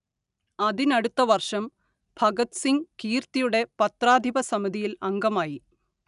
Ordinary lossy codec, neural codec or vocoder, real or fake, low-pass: none; none; real; 10.8 kHz